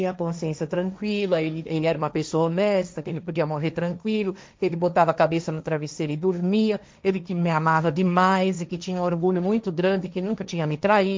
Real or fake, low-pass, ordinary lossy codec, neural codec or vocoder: fake; none; none; codec, 16 kHz, 1.1 kbps, Voila-Tokenizer